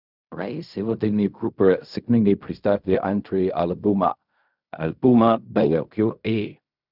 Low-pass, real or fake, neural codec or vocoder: 5.4 kHz; fake; codec, 16 kHz in and 24 kHz out, 0.4 kbps, LongCat-Audio-Codec, fine tuned four codebook decoder